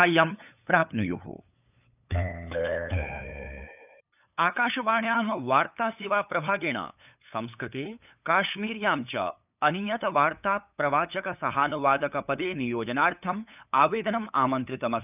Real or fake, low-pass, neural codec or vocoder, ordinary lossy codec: fake; 3.6 kHz; codec, 16 kHz, 8 kbps, FunCodec, trained on LibriTTS, 25 frames a second; none